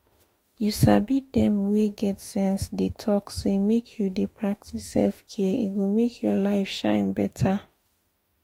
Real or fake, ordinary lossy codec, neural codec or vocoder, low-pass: fake; AAC, 48 kbps; autoencoder, 48 kHz, 32 numbers a frame, DAC-VAE, trained on Japanese speech; 19.8 kHz